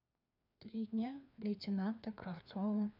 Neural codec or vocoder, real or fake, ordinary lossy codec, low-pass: codec, 16 kHz, 2 kbps, FreqCodec, larger model; fake; none; 5.4 kHz